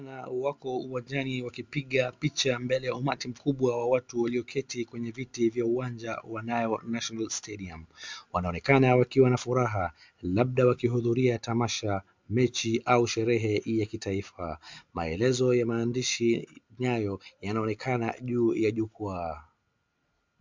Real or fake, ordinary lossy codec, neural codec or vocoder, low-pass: real; MP3, 64 kbps; none; 7.2 kHz